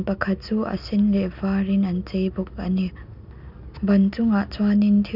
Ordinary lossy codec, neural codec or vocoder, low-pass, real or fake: none; none; 5.4 kHz; real